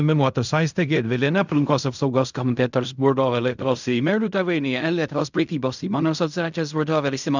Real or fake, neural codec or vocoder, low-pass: fake; codec, 16 kHz in and 24 kHz out, 0.4 kbps, LongCat-Audio-Codec, fine tuned four codebook decoder; 7.2 kHz